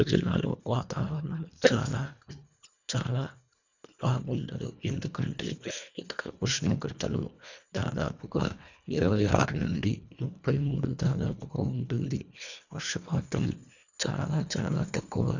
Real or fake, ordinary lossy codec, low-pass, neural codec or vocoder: fake; none; 7.2 kHz; codec, 24 kHz, 1.5 kbps, HILCodec